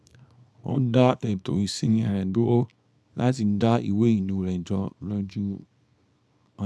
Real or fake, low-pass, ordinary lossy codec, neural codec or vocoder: fake; none; none; codec, 24 kHz, 0.9 kbps, WavTokenizer, small release